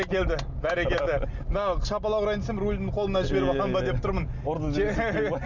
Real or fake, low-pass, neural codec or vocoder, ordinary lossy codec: real; 7.2 kHz; none; none